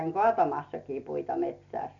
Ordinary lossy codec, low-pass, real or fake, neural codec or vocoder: none; 7.2 kHz; real; none